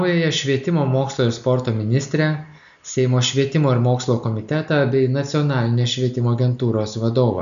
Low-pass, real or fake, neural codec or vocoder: 7.2 kHz; real; none